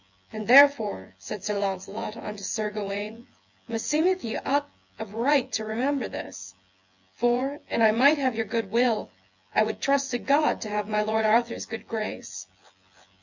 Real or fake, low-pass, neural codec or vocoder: fake; 7.2 kHz; vocoder, 24 kHz, 100 mel bands, Vocos